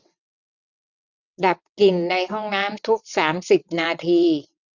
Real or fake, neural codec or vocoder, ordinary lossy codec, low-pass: fake; vocoder, 22.05 kHz, 80 mel bands, WaveNeXt; none; 7.2 kHz